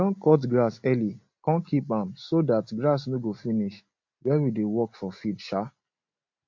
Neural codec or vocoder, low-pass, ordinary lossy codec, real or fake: none; 7.2 kHz; MP3, 48 kbps; real